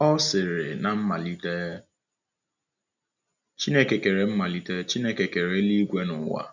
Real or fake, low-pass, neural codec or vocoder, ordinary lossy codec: real; 7.2 kHz; none; none